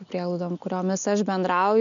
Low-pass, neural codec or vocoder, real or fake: 7.2 kHz; none; real